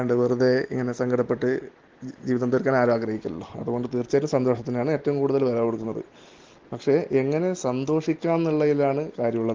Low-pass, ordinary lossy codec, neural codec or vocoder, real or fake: 7.2 kHz; Opus, 16 kbps; none; real